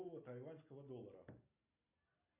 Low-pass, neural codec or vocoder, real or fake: 3.6 kHz; none; real